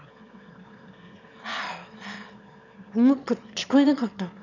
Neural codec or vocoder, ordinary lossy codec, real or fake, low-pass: autoencoder, 22.05 kHz, a latent of 192 numbers a frame, VITS, trained on one speaker; none; fake; 7.2 kHz